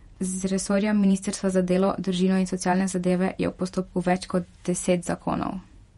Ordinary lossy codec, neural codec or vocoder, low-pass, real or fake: MP3, 48 kbps; vocoder, 48 kHz, 128 mel bands, Vocos; 19.8 kHz; fake